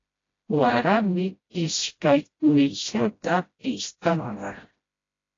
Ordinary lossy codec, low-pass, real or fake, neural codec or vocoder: AAC, 32 kbps; 7.2 kHz; fake; codec, 16 kHz, 0.5 kbps, FreqCodec, smaller model